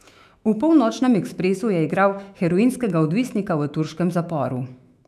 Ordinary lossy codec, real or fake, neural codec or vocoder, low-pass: none; fake; autoencoder, 48 kHz, 128 numbers a frame, DAC-VAE, trained on Japanese speech; 14.4 kHz